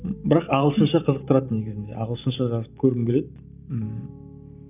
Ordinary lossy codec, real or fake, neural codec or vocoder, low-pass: none; fake; vocoder, 44.1 kHz, 128 mel bands every 512 samples, BigVGAN v2; 3.6 kHz